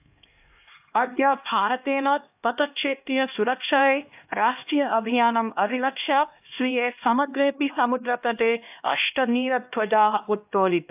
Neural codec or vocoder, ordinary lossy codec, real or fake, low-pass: codec, 16 kHz, 1 kbps, X-Codec, HuBERT features, trained on LibriSpeech; AAC, 32 kbps; fake; 3.6 kHz